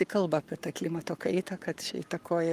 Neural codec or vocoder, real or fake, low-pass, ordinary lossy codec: codec, 44.1 kHz, 7.8 kbps, Pupu-Codec; fake; 14.4 kHz; Opus, 32 kbps